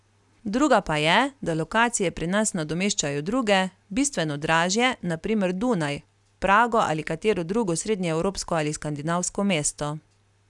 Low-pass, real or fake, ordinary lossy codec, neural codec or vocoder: 10.8 kHz; real; none; none